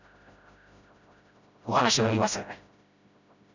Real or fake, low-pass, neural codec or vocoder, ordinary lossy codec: fake; 7.2 kHz; codec, 16 kHz, 0.5 kbps, FreqCodec, smaller model; none